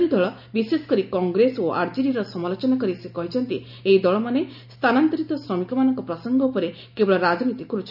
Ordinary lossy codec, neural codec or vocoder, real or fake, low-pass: none; none; real; 5.4 kHz